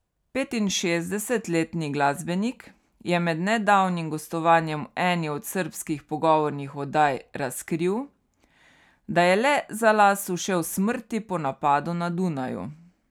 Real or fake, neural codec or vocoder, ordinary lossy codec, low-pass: real; none; none; 19.8 kHz